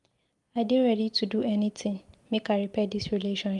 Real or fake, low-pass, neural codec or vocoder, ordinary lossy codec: real; 10.8 kHz; none; Opus, 32 kbps